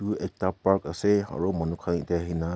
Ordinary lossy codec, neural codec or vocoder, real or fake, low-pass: none; none; real; none